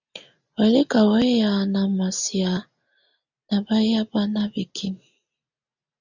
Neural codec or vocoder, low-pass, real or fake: none; 7.2 kHz; real